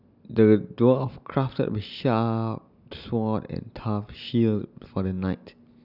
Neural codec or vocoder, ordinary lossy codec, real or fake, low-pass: none; none; real; 5.4 kHz